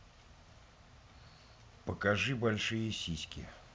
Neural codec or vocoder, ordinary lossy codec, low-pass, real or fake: none; none; none; real